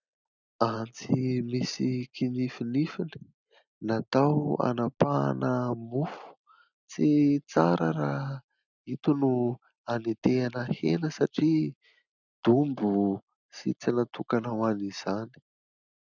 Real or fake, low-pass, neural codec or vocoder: real; 7.2 kHz; none